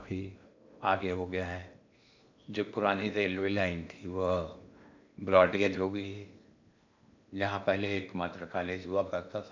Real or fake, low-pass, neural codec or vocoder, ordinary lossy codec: fake; 7.2 kHz; codec, 16 kHz in and 24 kHz out, 0.8 kbps, FocalCodec, streaming, 65536 codes; MP3, 64 kbps